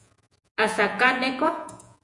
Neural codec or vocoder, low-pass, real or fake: vocoder, 48 kHz, 128 mel bands, Vocos; 10.8 kHz; fake